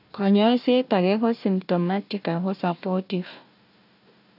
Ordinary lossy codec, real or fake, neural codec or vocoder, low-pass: none; fake; codec, 16 kHz, 1 kbps, FunCodec, trained on Chinese and English, 50 frames a second; 5.4 kHz